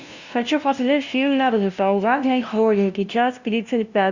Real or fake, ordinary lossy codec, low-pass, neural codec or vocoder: fake; Opus, 64 kbps; 7.2 kHz; codec, 16 kHz, 0.5 kbps, FunCodec, trained on LibriTTS, 25 frames a second